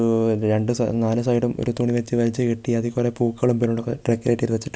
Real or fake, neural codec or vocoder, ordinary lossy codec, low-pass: real; none; none; none